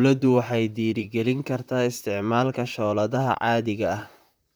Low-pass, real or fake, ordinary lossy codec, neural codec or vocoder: none; fake; none; vocoder, 44.1 kHz, 128 mel bands, Pupu-Vocoder